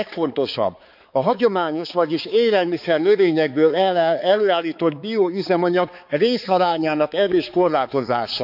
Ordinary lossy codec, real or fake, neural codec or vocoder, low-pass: none; fake; codec, 16 kHz, 4 kbps, X-Codec, HuBERT features, trained on balanced general audio; 5.4 kHz